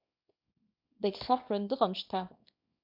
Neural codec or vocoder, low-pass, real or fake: codec, 24 kHz, 0.9 kbps, WavTokenizer, small release; 5.4 kHz; fake